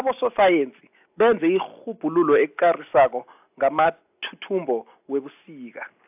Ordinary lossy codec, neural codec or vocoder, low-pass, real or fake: AAC, 32 kbps; none; 3.6 kHz; real